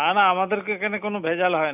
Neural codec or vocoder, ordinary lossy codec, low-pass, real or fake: none; none; 3.6 kHz; real